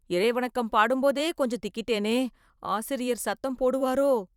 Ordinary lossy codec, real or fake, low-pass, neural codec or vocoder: none; fake; 19.8 kHz; vocoder, 44.1 kHz, 128 mel bands every 512 samples, BigVGAN v2